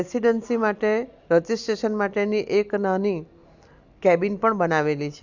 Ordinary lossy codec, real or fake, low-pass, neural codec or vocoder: Opus, 64 kbps; real; 7.2 kHz; none